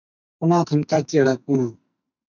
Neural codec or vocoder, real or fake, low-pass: codec, 44.1 kHz, 2.6 kbps, SNAC; fake; 7.2 kHz